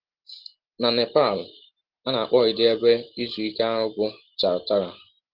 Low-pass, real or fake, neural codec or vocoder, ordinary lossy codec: 5.4 kHz; real; none; Opus, 16 kbps